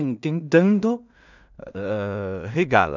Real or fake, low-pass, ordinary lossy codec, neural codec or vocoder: fake; 7.2 kHz; none; codec, 16 kHz in and 24 kHz out, 0.4 kbps, LongCat-Audio-Codec, two codebook decoder